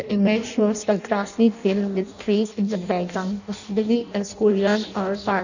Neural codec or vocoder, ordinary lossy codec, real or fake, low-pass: codec, 16 kHz in and 24 kHz out, 0.6 kbps, FireRedTTS-2 codec; none; fake; 7.2 kHz